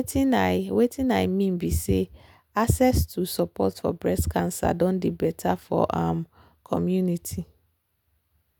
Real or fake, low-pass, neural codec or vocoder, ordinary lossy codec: real; none; none; none